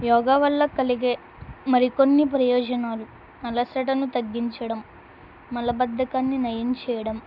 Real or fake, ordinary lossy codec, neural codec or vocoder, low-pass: real; none; none; 5.4 kHz